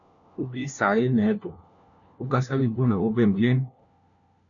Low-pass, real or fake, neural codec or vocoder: 7.2 kHz; fake; codec, 16 kHz, 1 kbps, FunCodec, trained on LibriTTS, 50 frames a second